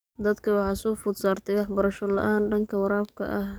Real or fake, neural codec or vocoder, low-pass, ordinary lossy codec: fake; codec, 44.1 kHz, 7.8 kbps, DAC; none; none